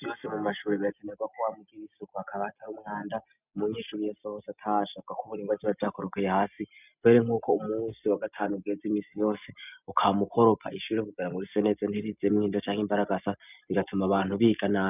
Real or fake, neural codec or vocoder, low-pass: real; none; 3.6 kHz